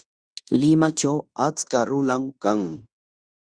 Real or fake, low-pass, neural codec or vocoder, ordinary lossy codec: fake; 9.9 kHz; codec, 16 kHz in and 24 kHz out, 0.9 kbps, LongCat-Audio-Codec, fine tuned four codebook decoder; Opus, 64 kbps